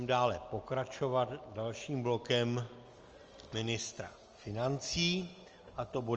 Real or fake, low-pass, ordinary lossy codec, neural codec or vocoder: real; 7.2 kHz; Opus, 24 kbps; none